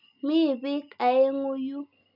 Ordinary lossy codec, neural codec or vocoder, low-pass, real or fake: none; none; 5.4 kHz; real